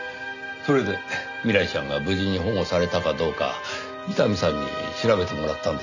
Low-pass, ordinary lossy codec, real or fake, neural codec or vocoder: 7.2 kHz; none; real; none